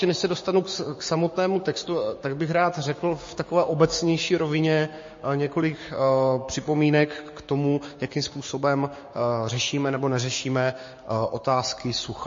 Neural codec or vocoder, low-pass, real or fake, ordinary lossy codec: codec, 16 kHz, 6 kbps, DAC; 7.2 kHz; fake; MP3, 32 kbps